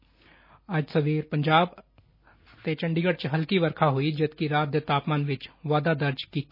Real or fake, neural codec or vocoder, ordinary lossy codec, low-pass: real; none; MP3, 24 kbps; 5.4 kHz